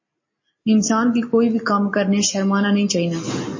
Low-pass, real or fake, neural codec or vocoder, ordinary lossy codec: 7.2 kHz; real; none; MP3, 32 kbps